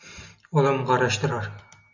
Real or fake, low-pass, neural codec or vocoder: real; 7.2 kHz; none